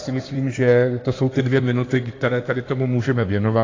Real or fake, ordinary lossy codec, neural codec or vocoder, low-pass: fake; AAC, 32 kbps; codec, 16 kHz in and 24 kHz out, 2.2 kbps, FireRedTTS-2 codec; 7.2 kHz